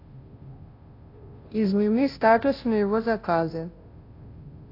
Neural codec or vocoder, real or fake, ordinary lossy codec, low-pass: codec, 16 kHz, 0.5 kbps, FunCodec, trained on Chinese and English, 25 frames a second; fake; AAC, 32 kbps; 5.4 kHz